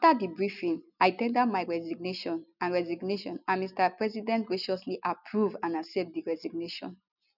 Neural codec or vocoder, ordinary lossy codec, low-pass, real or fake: none; none; 5.4 kHz; real